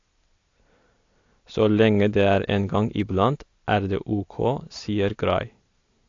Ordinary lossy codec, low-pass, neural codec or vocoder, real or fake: AAC, 48 kbps; 7.2 kHz; none; real